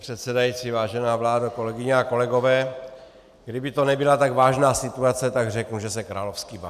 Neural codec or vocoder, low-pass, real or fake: none; 14.4 kHz; real